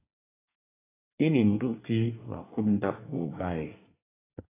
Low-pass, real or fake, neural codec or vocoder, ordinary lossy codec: 3.6 kHz; fake; codec, 24 kHz, 1 kbps, SNAC; AAC, 16 kbps